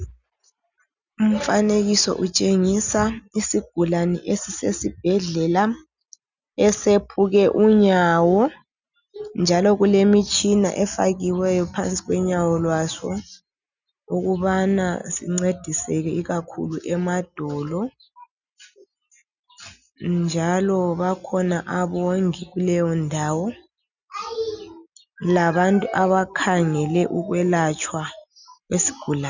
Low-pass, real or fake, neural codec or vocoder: 7.2 kHz; real; none